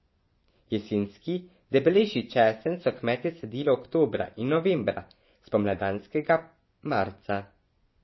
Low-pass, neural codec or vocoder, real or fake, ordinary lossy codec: 7.2 kHz; vocoder, 24 kHz, 100 mel bands, Vocos; fake; MP3, 24 kbps